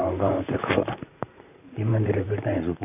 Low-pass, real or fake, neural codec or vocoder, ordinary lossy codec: 3.6 kHz; fake; vocoder, 44.1 kHz, 128 mel bands, Pupu-Vocoder; none